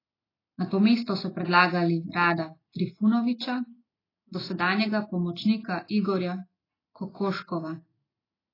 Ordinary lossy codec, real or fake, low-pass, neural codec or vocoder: AAC, 24 kbps; real; 5.4 kHz; none